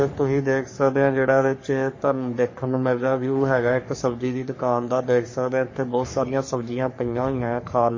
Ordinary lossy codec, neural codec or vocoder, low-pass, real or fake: MP3, 32 kbps; codec, 44.1 kHz, 3.4 kbps, Pupu-Codec; 7.2 kHz; fake